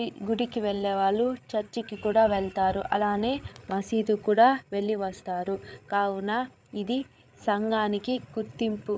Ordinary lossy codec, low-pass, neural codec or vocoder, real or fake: none; none; codec, 16 kHz, 8 kbps, FreqCodec, larger model; fake